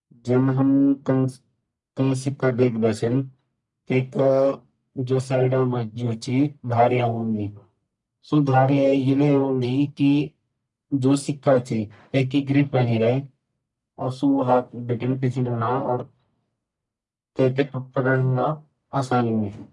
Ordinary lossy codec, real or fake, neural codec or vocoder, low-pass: none; fake; codec, 44.1 kHz, 1.7 kbps, Pupu-Codec; 10.8 kHz